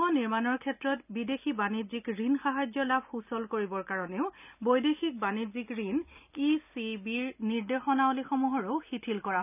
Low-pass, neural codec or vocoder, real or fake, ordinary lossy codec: 3.6 kHz; none; real; none